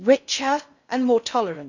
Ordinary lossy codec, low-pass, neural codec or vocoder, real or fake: none; 7.2 kHz; codec, 16 kHz in and 24 kHz out, 0.6 kbps, FocalCodec, streaming, 4096 codes; fake